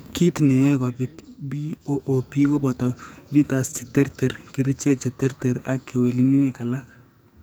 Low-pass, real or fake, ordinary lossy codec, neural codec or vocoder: none; fake; none; codec, 44.1 kHz, 2.6 kbps, SNAC